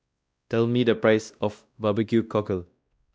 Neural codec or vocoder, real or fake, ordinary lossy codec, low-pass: codec, 16 kHz, 1 kbps, X-Codec, WavLM features, trained on Multilingual LibriSpeech; fake; none; none